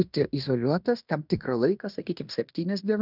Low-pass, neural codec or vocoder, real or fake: 5.4 kHz; codec, 16 kHz in and 24 kHz out, 0.9 kbps, LongCat-Audio-Codec, fine tuned four codebook decoder; fake